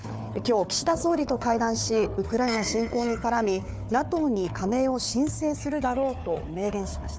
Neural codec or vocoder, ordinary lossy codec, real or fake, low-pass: codec, 16 kHz, 4 kbps, FunCodec, trained on Chinese and English, 50 frames a second; none; fake; none